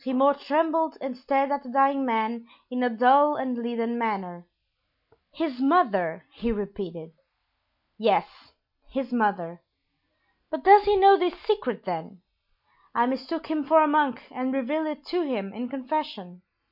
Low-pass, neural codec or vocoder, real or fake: 5.4 kHz; none; real